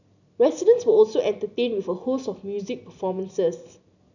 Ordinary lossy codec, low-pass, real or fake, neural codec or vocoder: none; 7.2 kHz; real; none